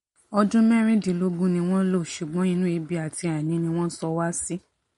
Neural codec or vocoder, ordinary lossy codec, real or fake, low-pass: none; MP3, 48 kbps; real; 19.8 kHz